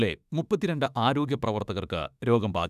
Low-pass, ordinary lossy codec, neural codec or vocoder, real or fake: 14.4 kHz; none; autoencoder, 48 kHz, 32 numbers a frame, DAC-VAE, trained on Japanese speech; fake